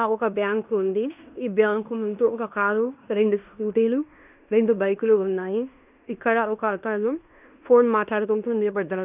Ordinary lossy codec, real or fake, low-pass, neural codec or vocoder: none; fake; 3.6 kHz; codec, 16 kHz in and 24 kHz out, 0.9 kbps, LongCat-Audio-Codec, fine tuned four codebook decoder